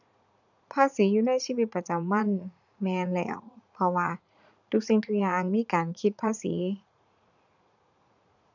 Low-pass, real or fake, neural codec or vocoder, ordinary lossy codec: 7.2 kHz; fake; vocoder, 44.1 kHz, 80 mel bands, Vocos; none